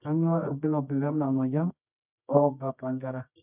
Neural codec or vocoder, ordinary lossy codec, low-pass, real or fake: codec, 24 kHz, 0.9 kbps, WavTokenizer, medium music audio release; none; 3.6 kHz; fake